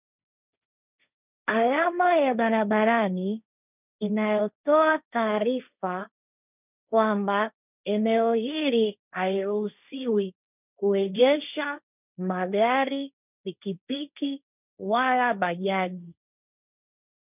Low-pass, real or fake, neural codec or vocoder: 3.6 kHz; fake; codec, 16 kHz, 1.1 kbps, Voila-Tokenizer